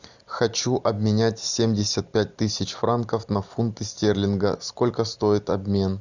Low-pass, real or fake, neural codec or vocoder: 7.2 kHz; real; none